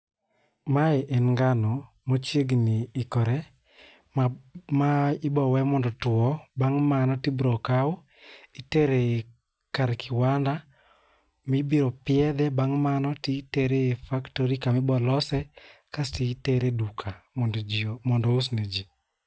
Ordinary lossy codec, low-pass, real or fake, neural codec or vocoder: none; none; real; none